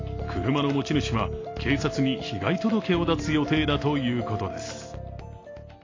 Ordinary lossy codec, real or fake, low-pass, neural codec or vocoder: AAC, 32 kbps; real; 7.2 kHz; none